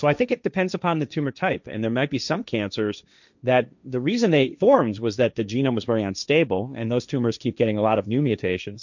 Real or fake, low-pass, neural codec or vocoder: fake; 7.2 kHz; codec, 16 kHz, 1.1 kbps, Voila-Tokenizer